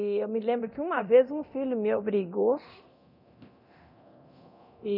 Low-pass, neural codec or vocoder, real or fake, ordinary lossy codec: 5.4 kHz; codec, 24 kHz, 0.9 kbps, DualCodec; fake; none